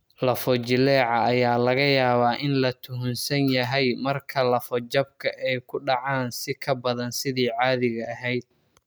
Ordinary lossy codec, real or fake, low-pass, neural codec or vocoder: none; real; none; none